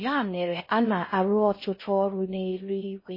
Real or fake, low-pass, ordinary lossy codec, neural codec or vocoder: fake; 5.4 kHz; MP3, 24 kbps; codec, 16 kHz in and 24 kHz out, 0.6 kbps, FocalCodec, streaming, 4096 codes